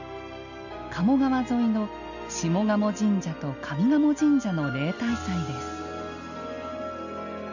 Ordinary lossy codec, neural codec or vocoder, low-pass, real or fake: none; none; 7.2 kHz; real